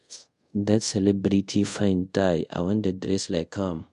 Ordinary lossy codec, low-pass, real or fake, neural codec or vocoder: MP3, 64 kbps; 10.8 kHz; fake; codec, 24 kHz, 0.5 kbps, DualCodec